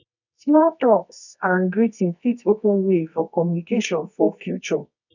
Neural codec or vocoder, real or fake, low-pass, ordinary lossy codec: codec, 24 kHz, 0.9 kbps, WavTokenizer, medium music audio release; fake; 7.2 kHz; none